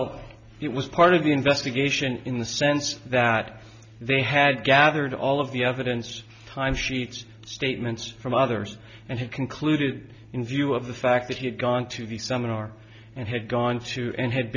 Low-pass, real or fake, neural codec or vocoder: 7.2 kHz; real; none